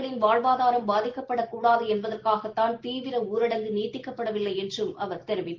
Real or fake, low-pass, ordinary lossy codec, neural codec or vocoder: real; 7.2 kHz; Opus, 16 kbps; none